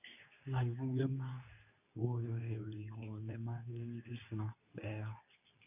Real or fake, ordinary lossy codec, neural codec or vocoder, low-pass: fake; none; codec, 24 kHz, 0.9 kbps, WavTokenizer, medium speech release version 2; 3.6 kHz